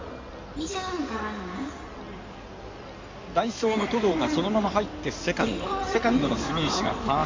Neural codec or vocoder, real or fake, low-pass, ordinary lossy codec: codec, 16 kHz in and 24 kHz out, 2.2 kbps, FireRedTTS-2 codec; fake; 7.2 kHz; MP3, 48 kbps